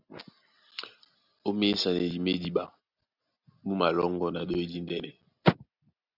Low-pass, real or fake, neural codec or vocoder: 5.4 kHz; real; none